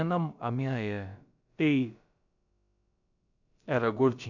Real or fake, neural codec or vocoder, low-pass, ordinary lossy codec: fake; codec, 16 kHz, about 1 kbps, DyCAST, with the encoder's durations; 7.2 kHz; none